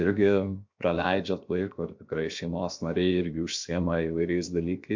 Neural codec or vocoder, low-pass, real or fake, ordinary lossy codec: codec, 16 kHz, 0.7 kbps, FocalCodec; 7.2 kHz; fake; MP3, 48 kbps